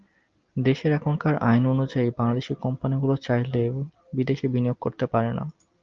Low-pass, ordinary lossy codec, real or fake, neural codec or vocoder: 7.2 kHz; Opus, 24 kbps; real; none